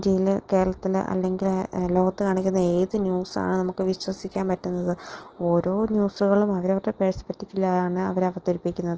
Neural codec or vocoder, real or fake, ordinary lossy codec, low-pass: none; real; Opus, 16 kbps; 7.2 kHz